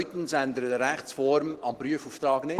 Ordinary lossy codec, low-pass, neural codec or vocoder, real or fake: Opus, 16 kbps; 14.4 kHz; vocoder, 44.1 kHz, 128 mel bands every 512 samples, BigVGAN v2; fake